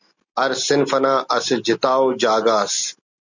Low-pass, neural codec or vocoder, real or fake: 7.2 kHz; none; real